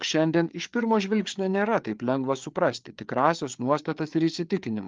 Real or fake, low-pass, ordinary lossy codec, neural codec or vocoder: fake; 7.2 kHz; Opus, 32 kbps; codec, 16 kHz, 4 kbps, FreqCodec, larger model